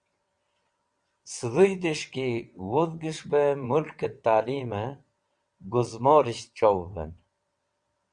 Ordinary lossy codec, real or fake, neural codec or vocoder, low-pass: Opus, 64 kbps; fake; vocoder, 22.05 kHz, 80 mel bands, WaveNeXt; 9.9 kHz